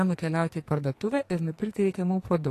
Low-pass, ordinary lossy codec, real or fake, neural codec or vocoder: 14.4 kHz; AAC, 48 kbps; fake; codec, 44.1 kHz, 2.6 kbps, SNAC